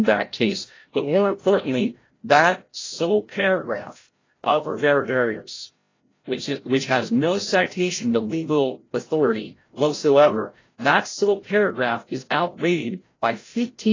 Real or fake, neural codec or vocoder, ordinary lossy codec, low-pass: fake; codec, 16 kHz, 0.5 kbps, FreqCodec, larger model; AAC, 32 kbps; 7.2 kHz